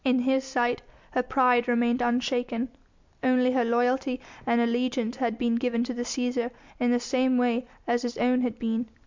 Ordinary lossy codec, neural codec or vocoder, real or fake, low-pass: MP3, 64 kbps; none; real; 7.2 kHz